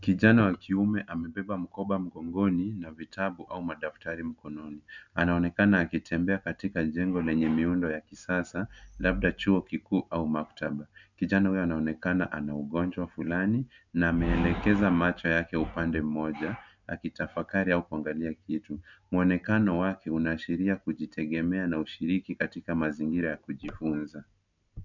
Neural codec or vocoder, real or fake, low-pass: none; real; 7.2 kHz